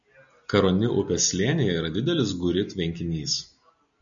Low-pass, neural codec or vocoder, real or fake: 7.2 kHz; none; real